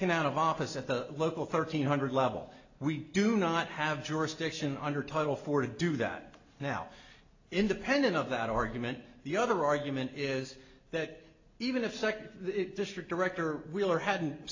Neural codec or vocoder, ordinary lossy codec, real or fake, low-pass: none; AAC, 48 kbps; real; 7.2 kHz